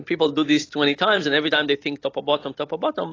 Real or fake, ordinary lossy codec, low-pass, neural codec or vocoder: real; AAC, 32 kbps; 7.2 kHz; none